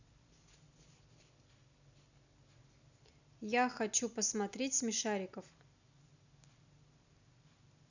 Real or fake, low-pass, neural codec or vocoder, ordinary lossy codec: real; 7.2 kHz; none; none